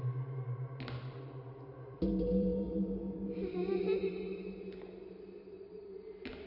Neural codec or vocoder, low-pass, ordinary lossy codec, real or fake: vocoder, 44.1 kHz, 128 mel bands every 512 samples, BigVGAN v2; 5.4 kHz; AAC, 48 kbps; fake